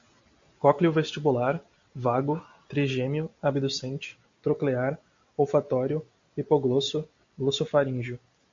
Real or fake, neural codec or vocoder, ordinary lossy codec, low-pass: real; none; MP3, 48 kbps; 7.2 kHz